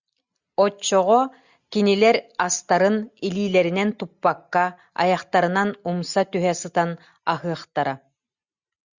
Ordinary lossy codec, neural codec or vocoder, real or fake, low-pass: Opus, 64 kbps; none; real; 7.2 kHz